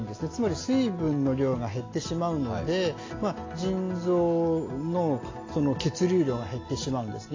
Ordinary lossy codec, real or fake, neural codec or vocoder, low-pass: AAC, 32 kbps; real; none; 7.2 kHz